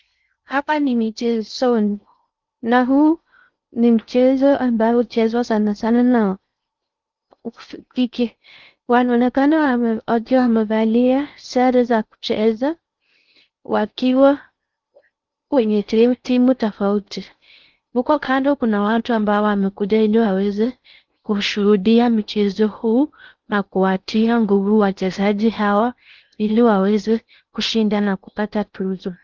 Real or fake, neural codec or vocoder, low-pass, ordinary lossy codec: fake; codec, 16 kHz in and 24 kHz out, 0.6 kbps, FocalCodec, streaming, 4096 codes; 7.2 kHz; Opus, 24 kbps